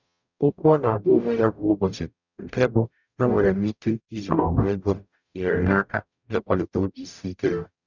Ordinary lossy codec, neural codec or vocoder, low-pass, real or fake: none; codec, 44.1 kHz, 0.9 kbps, DAC; 7.2 kHz; fake